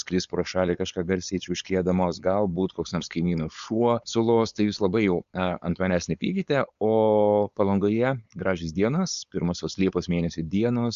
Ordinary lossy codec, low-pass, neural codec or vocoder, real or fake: Opus, 64 kbps; 7.2 kHz; codec, 16 kHz, 4.8 kbps, FACodec; fake